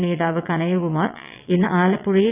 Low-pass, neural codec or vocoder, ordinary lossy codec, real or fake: 3.6 kHz; vocoder, 22.05 kHz, 80 mel bands, WaveNeXt; none; fake